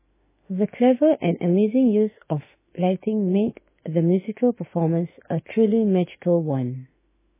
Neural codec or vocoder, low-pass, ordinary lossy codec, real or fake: autoencoder, 48 kHz, 32 numbers a frame, DAC-VAE, trained on Japanese speech; 3.6 kHz; MP3, 16 kbps; fake